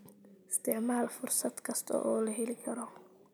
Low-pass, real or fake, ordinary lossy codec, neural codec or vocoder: none; real; none; none